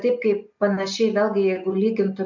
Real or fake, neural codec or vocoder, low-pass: real; none; 7.2 kHz